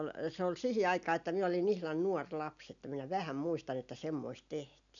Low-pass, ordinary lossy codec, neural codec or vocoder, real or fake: 7.2 kHz; none; none; real